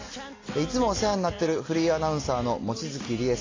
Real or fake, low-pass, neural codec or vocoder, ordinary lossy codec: real; 7.2 kHz; none; AAC, 32 kbps